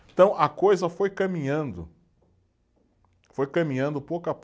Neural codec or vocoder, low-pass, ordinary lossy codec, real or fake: none; none; none; real